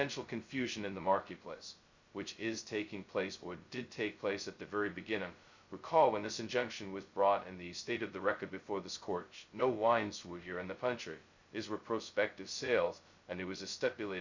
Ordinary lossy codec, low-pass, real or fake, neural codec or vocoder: Opus, 64 kbps; 7.2 kHz; fake; codec, 16 kHz, 0.2 kbps, FocalCodec